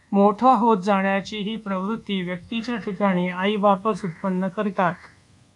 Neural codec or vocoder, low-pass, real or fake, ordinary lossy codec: codec, 24 kHz, 1.2 kbps, DualCodec; 10.8 kHz; fake; AAC, 64 kbps